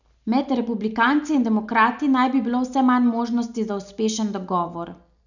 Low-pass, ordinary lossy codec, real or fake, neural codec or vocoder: 7.2 kHz; none; real; none